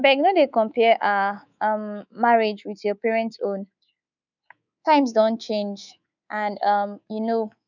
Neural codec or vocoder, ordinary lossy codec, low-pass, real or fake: codec, 24 kHz, 3.1 kbps, DualCodec; none; 7.2 kHz; fake